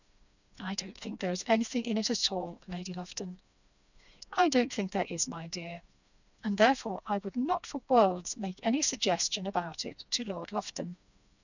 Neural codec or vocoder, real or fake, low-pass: codec, 16 kHz, 2 kbps, FreqCodec, smaller model; fake; 7.2 kHz